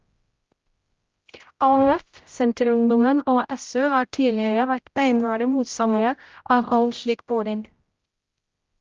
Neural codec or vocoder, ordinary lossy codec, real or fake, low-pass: codec, 16 kHz, 0.5 kbps, X-Codec, HuBERT features, trained on general audio; Opus, 32 kbps; fake; 7.2 kHz